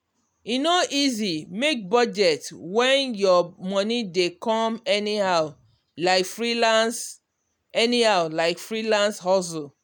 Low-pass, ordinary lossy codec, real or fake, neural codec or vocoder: none; none; real; none